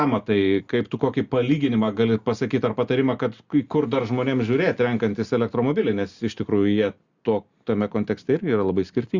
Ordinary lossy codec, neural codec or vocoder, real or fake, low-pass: Opus, 64 kbps; none; real; 7.2 kHz